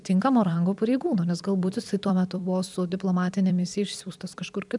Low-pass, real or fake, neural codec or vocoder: 10.8 kHz; fake; vocoder, 44.1 kHz, 128 mel bands every 256 samples, BigVGAN v2